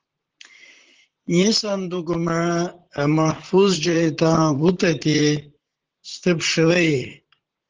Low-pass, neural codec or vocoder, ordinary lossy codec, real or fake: 7.2 kHz; vocoder, 44.1 kHz, 128 mel bands, Pupu-Vocoder; Opus, 16 kbps; fake